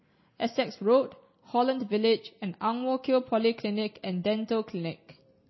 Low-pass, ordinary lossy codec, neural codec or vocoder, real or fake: 7.2 kHz; MP3, 24 kbps; none; real